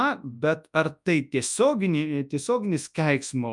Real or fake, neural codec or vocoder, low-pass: fake; codec, 24 kHz, 0.9 kbps, WavTokenizer, large speech release; 10.8 kHz